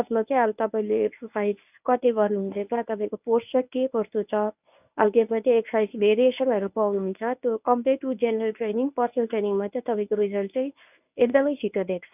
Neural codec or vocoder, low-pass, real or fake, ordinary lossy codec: codec, 24 kHz, 0.9 kbps, WavTokenizer, medium speech release version 1; 3.6 kHz; fake; none